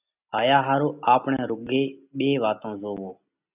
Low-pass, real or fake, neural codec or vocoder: 3.6 kHz; real; none